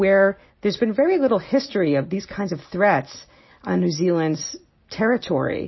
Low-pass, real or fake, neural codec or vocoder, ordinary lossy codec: 7.2 kHz; real; none; MP3, 24 kbps